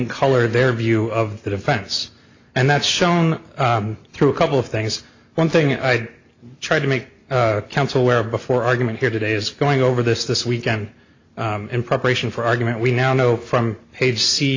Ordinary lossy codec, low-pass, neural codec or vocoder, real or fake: AAC, 48 kbps; 7.2 kHz; none; real